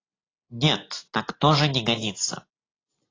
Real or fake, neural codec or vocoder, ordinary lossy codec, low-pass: real; none; AAC, 32 kbps; 7.2 kHz